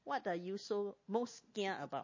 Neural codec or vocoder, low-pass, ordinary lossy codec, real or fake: none; 7.2 kHz; MP3, 32 kbps; real